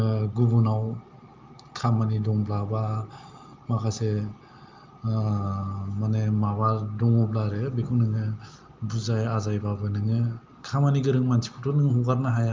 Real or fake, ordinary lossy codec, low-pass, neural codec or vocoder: real; Opus, 24 kbps; 7.2 kHz; none